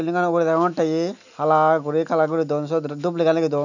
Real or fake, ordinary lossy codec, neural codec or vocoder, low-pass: real; none; none; 7.2 kHz